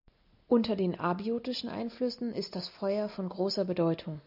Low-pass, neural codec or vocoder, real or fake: 5.4 kHz; none; real